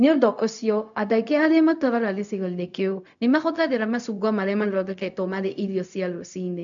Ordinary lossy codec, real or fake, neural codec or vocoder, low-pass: none; fake; codec, 16 kHz, 0.4 kbps, LongCat-Audio-Codec; 7.2 kHz